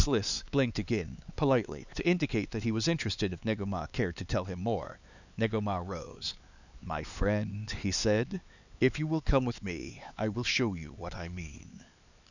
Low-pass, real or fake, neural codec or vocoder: 7.2 kHz; fake; codec, 16 kHz, 4 kbps, X-Codec, HuBERT features, trained on LibriSpeech